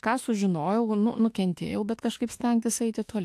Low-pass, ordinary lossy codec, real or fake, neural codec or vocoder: 14.4 kHz; AAC, 64 kbps; fake; autoencoder, 48 kHz, 32 numbers a frame, DAC-VAE, trained on Japanese speech